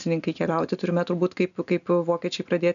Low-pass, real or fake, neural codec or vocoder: 7.2 kHz; real; none